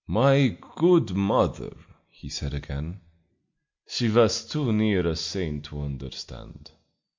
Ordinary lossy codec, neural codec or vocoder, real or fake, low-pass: MP3, 48 kbps; none; real; 7.2 kHz